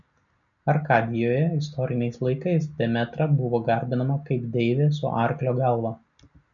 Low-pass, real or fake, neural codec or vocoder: 7.2 kHz; real; none